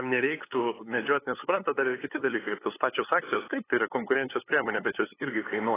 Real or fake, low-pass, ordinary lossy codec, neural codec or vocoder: fake; 3.6 kHz; AAC, 16 kbps; codec, 16 kHz, 8 kbps, FunCodec, trained on LibriTTS, 25 frames a second